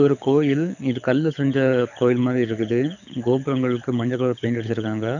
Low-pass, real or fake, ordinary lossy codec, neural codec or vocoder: 7.2 kHz; fake; none; codec, 24 kHz, 6 kbps, HILCodec